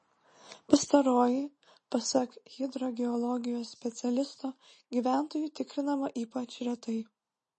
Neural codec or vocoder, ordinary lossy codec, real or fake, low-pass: none; MP3, 32 kbps; real; 10.8 kHz